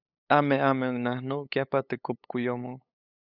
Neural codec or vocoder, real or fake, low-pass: codec, 16 kHz, 8 kbps, FunCodec, trained on LibriTTS, 25 frames a second; fake; 5.4 kHz